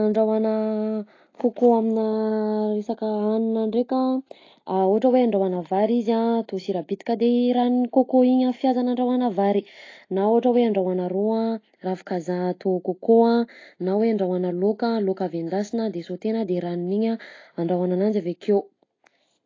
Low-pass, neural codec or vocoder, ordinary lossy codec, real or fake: 7.2 kHz; none; AAC, 32 kbps; real